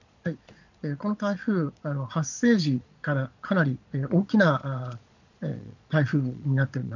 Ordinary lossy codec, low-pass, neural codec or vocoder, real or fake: none; 7.2 kHz; vocoder, 22.05 kHz, 80 mel bands, Vocos; fake